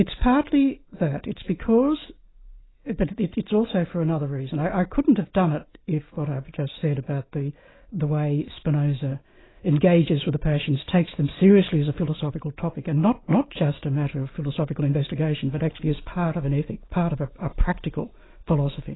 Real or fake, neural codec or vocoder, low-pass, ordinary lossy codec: real; none; 7.2 kHz; AAC, 16 kbps